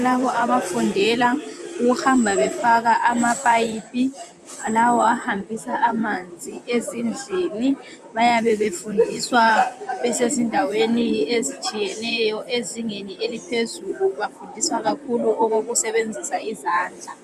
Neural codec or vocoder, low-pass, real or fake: vocoder, 44.1 kHz, 128 mel bands, Pupu-Vocoder; 14.4 kHz; fake